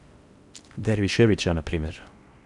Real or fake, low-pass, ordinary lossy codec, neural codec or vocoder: fake; 10.8 kHz; none; codec, 16 kHz in and 24 kHz out, 0.6 kbps, FocalCodec, streaming, 4096 codes